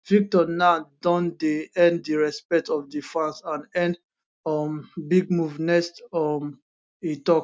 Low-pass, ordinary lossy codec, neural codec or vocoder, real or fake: none; none; none; real